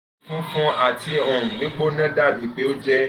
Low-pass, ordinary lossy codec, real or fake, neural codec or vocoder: 19.8 kHz; Opus, 24 kbps; fake; vocoder, 48 kHz, 128 mel bands, Vocos